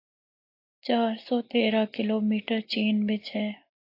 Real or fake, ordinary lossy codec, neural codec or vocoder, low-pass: real; AAC, 32 kbps; none; 5.4 kHz